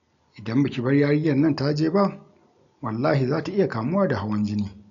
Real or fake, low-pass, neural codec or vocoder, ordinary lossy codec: real; 7.2 kHz; none; none